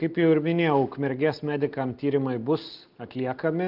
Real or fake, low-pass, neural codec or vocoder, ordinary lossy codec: real; 5.4 kHz; none; Opus, 24 kbps